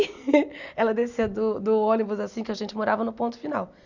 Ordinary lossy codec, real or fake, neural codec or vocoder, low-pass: none; real; none; 7.2 kHz